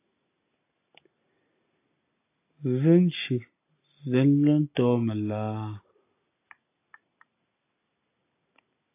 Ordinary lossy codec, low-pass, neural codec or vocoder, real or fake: AAC, 24 kbps; 3.6 kHz; none; real